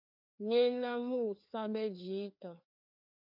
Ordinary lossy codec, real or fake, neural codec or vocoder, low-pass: MP3, 48 kbps; fake; codec, 16 kHz, 2 kbps, FreqCodec, larger model; 5.4 kHz